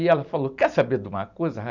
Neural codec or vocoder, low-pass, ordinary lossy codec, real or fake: none; 7.2 kHz; none; real